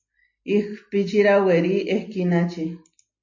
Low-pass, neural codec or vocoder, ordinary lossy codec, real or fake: 7.2 kHz; none; MP3, 32 kbps; real